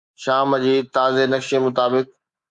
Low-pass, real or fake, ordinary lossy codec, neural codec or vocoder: 10.8 kHz; fake; Opus, 64 kbps; codec, 24 kHz, 3.1 kbps, DualCodec